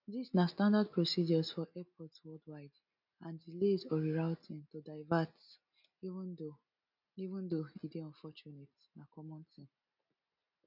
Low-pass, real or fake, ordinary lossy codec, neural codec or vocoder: 5.4 kHz; real; none; none